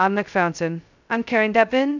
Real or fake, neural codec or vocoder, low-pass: fake; codec, 16 kHz, 0.2 kbps, FocalCodec; 7.2 kHz